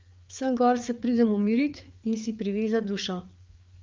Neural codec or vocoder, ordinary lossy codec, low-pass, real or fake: codec, 16 kHz, 4 kbps, FreqCodec, larger model; Opus, 24 kbps; 7.2 kHz; fake